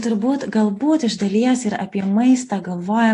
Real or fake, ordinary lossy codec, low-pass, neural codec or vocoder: real; AAC, 48 kbps; 10.8 kHz; none